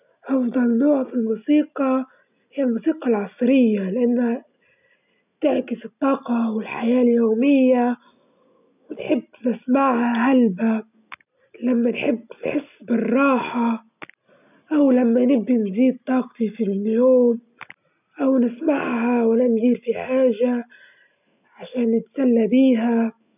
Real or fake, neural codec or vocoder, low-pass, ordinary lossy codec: real; none; 3.6 kHz; none